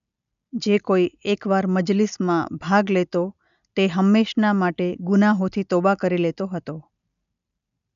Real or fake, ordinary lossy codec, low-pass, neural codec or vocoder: real; none; 7.2 kHz; none